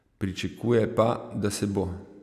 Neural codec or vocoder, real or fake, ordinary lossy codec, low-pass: none; real; none; 14.4 kHz